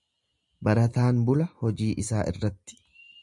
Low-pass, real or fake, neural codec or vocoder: 10.8 kHz; real; none